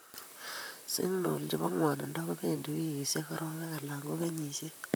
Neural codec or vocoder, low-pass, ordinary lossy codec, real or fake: vocoder, 44.1 kHz, 128 mel bands, Pupu-Vocoder; none; none; fake